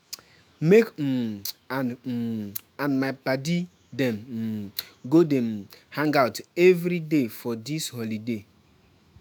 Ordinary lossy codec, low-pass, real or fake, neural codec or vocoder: none; none; fake; autoencoder, 48 kHz, 128 numbers a frame, DAC-VAE, trained on Japanese speech